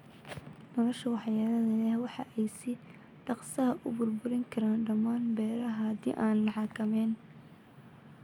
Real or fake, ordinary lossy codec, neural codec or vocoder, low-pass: real; none; none; 19.8 kHz